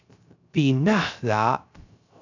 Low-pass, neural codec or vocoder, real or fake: 7.2 kHz; codec, 16 kHz, 0.3 kbps, FocalCodec; fake